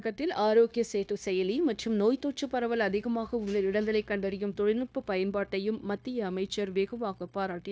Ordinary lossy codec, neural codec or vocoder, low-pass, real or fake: none; codec, 16 kHz, 0.9 kbps, LongCat-Audio-Codec; none; fake